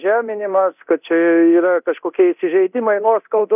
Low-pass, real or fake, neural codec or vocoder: 3.6 kHz; fake; codec, 24 kHz, 0.9 kbps, DualCodec